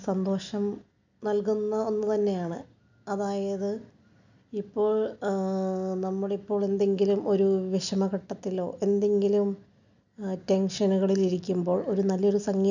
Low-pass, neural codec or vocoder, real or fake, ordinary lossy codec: 7.2 kHz; none; real; none